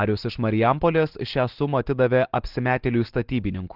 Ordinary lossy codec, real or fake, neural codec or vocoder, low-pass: Opus, 16 kbps; real; none; 5.4 kHz